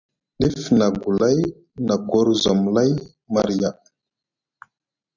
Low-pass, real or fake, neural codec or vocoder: 7.2 kHz; real; none